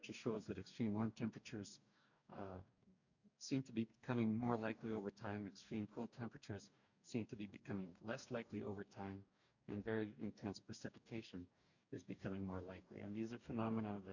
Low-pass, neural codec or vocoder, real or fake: 7.2 kHz; codec, 44.1 kHz, 2.6 kbps, DAC; fake